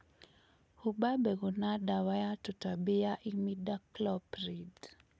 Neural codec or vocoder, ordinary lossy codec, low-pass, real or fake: none; none; none; real